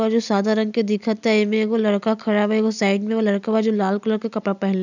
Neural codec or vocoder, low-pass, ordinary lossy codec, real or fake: none; 7.2 kHz; none; real